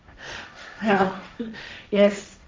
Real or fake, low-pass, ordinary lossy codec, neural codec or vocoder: fake; none; none; codec, 16 kHz, 1.1 kbps, Voila-Tokenizer